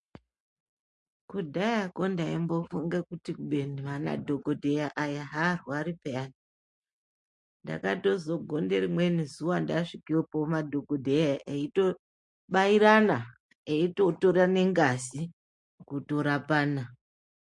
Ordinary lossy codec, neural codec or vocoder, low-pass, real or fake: MP3, 48 kbps; none; 10.8 kHz; real